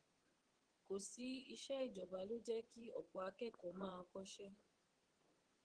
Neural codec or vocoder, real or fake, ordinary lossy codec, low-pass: vocoder, 22.05 kHz, 80 mel bands, WaveNeXt; fake; Opus, 16 kbps; 9.9 kHz